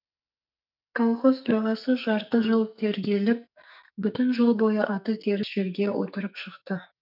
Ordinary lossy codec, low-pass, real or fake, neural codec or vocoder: none; 5.4 kHz; fake; codec, 44.1 kHz, 2.6 kbps, SNAC